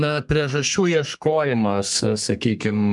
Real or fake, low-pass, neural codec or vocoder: fake; 10.8 kHz; codec, 32 kHz, 1.9 kbps, SNAC